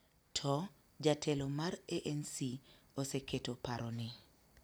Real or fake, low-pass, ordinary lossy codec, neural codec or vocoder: real; none; none; none